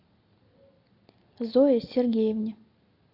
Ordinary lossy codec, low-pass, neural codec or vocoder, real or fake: AAC, 32 kbps; 5.4 kHz; none; real